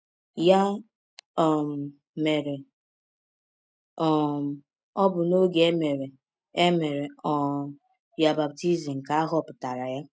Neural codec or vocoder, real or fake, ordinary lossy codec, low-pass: none; real; none; none